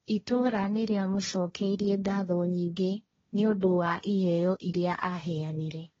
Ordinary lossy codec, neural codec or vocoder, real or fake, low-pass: AAC, 24 kbps; codec, 16 kHz, 1.1 kbps, Voila-Tokenizer; fake; 7.2 kHz